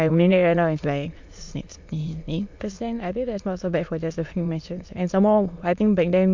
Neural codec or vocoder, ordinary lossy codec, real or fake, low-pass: autoencoder, 22.05 kHz, a latent of 192 numbers a frame, VITS, trained on many speakers; MP3, 48 kbps; fake; 7.2 kHz